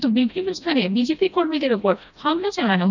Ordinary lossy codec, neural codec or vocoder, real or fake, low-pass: none; codec, 16 kHz, 1 kbps, FreqCodec, smaller model; fake; 7.2 kHz